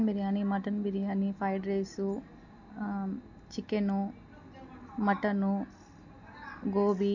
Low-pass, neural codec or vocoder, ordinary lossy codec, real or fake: 7.2 kHz; none; none; real